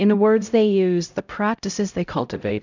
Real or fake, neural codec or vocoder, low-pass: fake; codec, 16 kHz, 0.5 kbps, X-Codec, HuBERT features, trained on LibriSpeech; 7.2 kHz